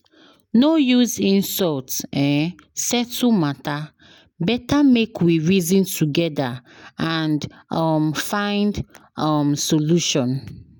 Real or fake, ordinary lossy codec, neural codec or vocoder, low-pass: real; none; none; none